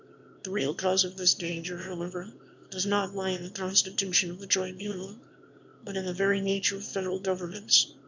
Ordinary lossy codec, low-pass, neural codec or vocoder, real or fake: MP3, 64 kbps; 7.2 kHz; autoencoder, 22.05 kHz, a latent of 192 numbers a frame, VITS, trained on one speaker; fake